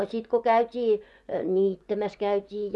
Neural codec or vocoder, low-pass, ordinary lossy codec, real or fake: vocoder, 24 kHz, 100 mel bands, Vocos; none; none; fake